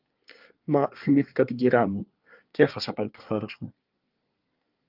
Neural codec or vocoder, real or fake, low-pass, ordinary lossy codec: codec, 24 kHz, 1 kbps, SNAC; fake; 5.4 kHz; Opus, 24 kbps